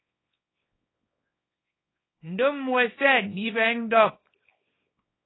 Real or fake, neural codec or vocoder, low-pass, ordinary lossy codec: fake; codec, 24 kHz, 0.9 kbps, WavTokenizer, small release; 7.2 kHz; AAC, 16 kbps